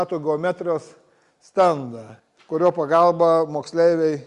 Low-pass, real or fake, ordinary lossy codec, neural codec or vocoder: 10.8 kHz; real; Opus, 64 kbps; none